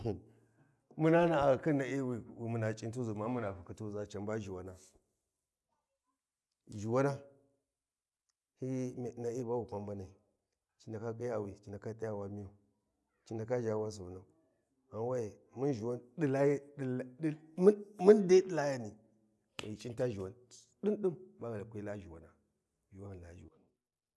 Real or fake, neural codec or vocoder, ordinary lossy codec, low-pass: real; none; none; none